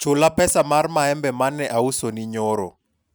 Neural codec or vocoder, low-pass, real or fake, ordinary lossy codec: none; none; real; none